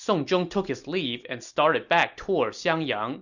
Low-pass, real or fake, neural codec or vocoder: 7.2 kHz; real; none